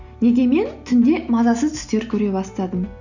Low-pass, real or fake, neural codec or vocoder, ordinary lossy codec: 7.2 kHz; real; none; none